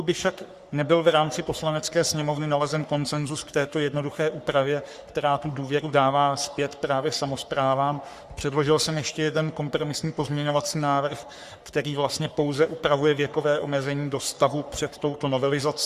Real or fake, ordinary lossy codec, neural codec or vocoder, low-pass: fake; Opus, 64 kbps; codec, 44.1 kHz, 3.4 kbps, Pupu-Codec; 14.4 kHz